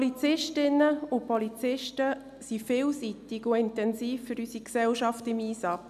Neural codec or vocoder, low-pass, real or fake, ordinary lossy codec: none; 14.4 kHz; real; none